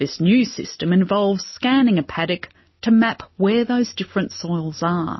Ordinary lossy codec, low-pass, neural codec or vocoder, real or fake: MP3, 24 kbps; 7.2 kHz; none; real